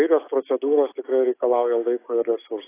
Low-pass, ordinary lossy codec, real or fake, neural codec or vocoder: 3.6 kHz; AAC, 16 kbps; real; none